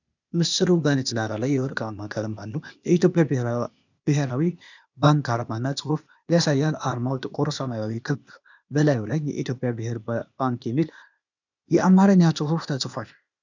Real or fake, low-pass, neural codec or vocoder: fake; 7.2 kHz; codec, 16 kHz, 0.8 kbps, ZipCodec